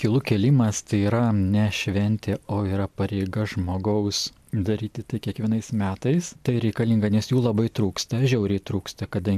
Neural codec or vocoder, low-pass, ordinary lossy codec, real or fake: none; 14.4 kHz; MP3, 96 kbps; real